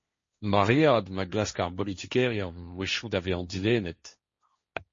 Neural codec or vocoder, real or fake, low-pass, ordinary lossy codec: codec, 16 kHz, 1.1 kbps, Voila-Tokenizer; fake; 7.2 kHz; MP3, 32 kbps